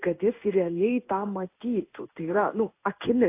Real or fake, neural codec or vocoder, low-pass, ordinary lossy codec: fake; codec, 16 kHz in and 24 kHz out, 1 kbps, XY-Tokenizer; 3.6 kHz; AAC, 24 kbps